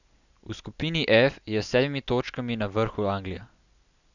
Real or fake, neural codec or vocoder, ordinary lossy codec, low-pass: real; none; none; 7.2 kHz